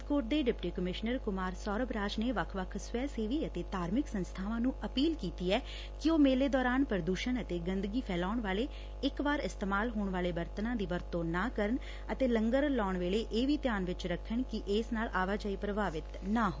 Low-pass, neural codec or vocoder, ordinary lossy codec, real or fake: none; none; none; real